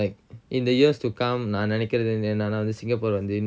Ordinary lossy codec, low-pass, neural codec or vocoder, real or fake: none; none; none; real